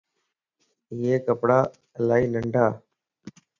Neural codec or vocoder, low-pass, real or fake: none; 7.2 kHz; real